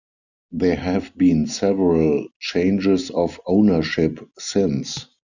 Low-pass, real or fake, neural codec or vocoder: 7.2 kHz; real; none